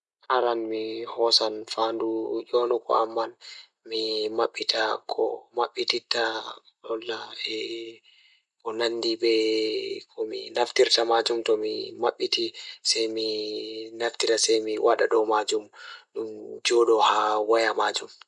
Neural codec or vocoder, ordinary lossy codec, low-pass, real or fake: none; none; 10.8 kHz; real